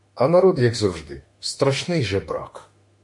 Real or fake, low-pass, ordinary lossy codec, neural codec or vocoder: fake; 10.8 kHz; MP3, 48 kbps; autoencoder, 48 kHz, 32 numbers a frame, DAC-VAE, trained on Japanese speech